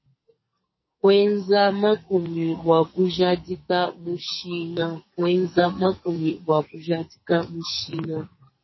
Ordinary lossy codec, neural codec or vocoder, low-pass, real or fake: MP3, 24 kbps; codec, 44.1 kHz, 2.6 kbps, SNAC; 7.2 kHz; fake